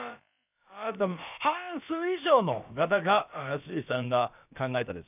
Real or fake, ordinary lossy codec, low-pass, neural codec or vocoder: fake; none; 3.6 kHz; codec, 16 kHz, about 1 kbps, DyCAST, with the encoder's durations